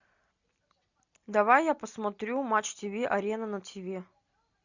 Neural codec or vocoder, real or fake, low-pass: none; real; 7.2 kHz